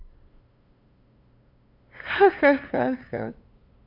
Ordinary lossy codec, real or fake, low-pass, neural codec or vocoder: none; fake; 5.4 kHz; codec, 16 kHz, 2 kbps, FunCodec, trained on LibriTTS, 25 frames a second